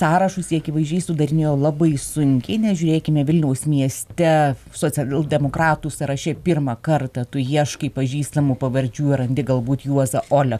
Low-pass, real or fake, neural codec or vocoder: 14.4 kHz; real; none